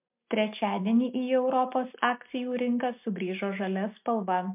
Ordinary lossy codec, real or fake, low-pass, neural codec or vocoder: MP3, 32 kbps; real; 3.6 kHz; none